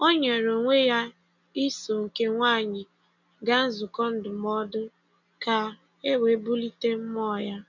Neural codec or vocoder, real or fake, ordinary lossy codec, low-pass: none; real; none; 7.2 kHz